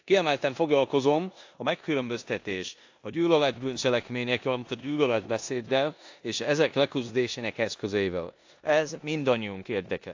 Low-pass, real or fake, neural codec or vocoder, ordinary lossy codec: 7.2 kHz; fake; codec, 16 kHz in and 24 kHz out, 0.9 kbps, LongCat-Audio-Codec, four codebook decoder; AAC, 48 kbps